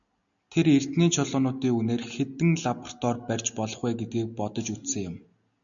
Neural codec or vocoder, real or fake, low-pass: none; real; 7.2 kHz